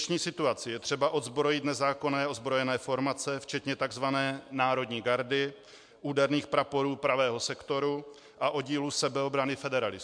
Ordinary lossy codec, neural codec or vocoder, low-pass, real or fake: MP3, 64 kbps; none; 9.9 kHz; real